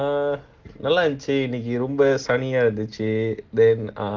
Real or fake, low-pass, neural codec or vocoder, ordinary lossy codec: real; 7.2 kHz; none; Opus, 16 kbps